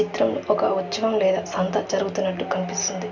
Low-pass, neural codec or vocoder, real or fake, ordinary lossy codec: 7.2 kHz; none; real; none